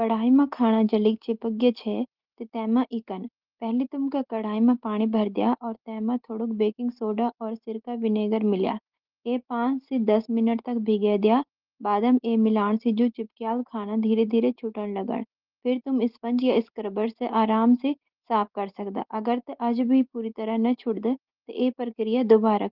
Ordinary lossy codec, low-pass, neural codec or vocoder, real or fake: Opus, 16 kbps; 5.4 kHz; none; real